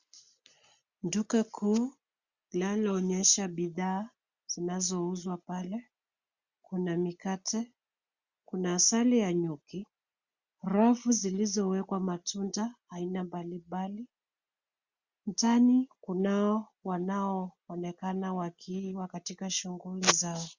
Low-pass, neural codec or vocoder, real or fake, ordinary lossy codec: 7.2 kHz; none; real; Opus, 64 kbps